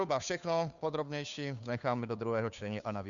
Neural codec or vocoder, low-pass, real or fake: codec, 16 kHz, 2 kbps, FunCodec, trained on Chinese and English, 25 frames a second; 7.2 kHz; fake